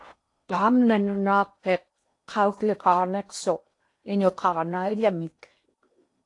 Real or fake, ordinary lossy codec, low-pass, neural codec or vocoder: fake; AAC, 48 kbps; 10.8 kHz; codec, 16 kHz in and 24 kHz out, 0.8 kbps, FocalCodec, streaming, 65536 codes